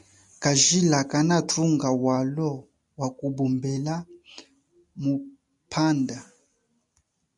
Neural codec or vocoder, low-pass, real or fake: none; 10.8 kHz; real